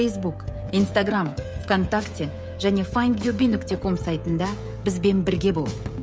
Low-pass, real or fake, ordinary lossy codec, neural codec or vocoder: none; fake; none; codec, 16 kHz, 16 kbps, FreqCodec, smaller model